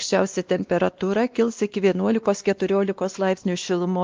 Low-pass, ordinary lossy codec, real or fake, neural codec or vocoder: 7.2 kHz; Opus, 24 kbps; fake; codec, 16 kHz, 2 kbps, X-Codec, WavLM features, trained on Multilingual LibriSpeech